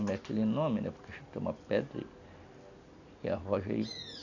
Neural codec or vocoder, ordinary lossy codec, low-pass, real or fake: none; none; 7.2 kHz; real